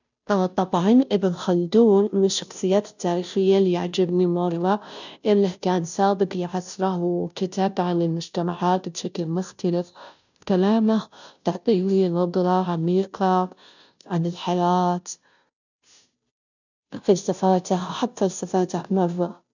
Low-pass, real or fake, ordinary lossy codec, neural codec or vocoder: 7.2 kHz; fake; none; codec, 16 kHz, 0.5 kbps, FunCodec, trained on Chinese and English, 25 frames a second